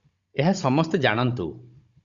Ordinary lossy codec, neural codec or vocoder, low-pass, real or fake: Opus, 64 kbps; codec, 16 kHz, 16 kbps, FunCodec, trained on Chinese and English, 50 frames a second; 7.2 kHz; fake